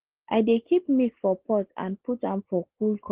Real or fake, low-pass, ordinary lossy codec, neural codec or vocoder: real; 3.6 kHz; Opus, 16 kbps; none